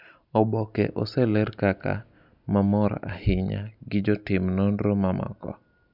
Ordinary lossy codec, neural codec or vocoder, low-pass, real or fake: none; none; 5.4 kHz; real